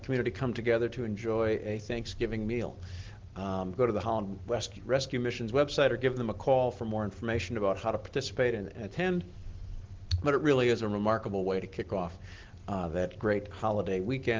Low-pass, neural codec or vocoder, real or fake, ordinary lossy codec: 7.2 kHz; none; real; Opus, 16 kbps